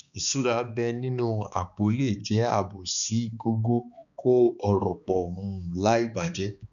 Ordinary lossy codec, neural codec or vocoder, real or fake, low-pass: none; codec, 16 kHz, 2 kbps, X-Codec, HuBERT features, trained on balanced general audio; fake; 7.2 kHz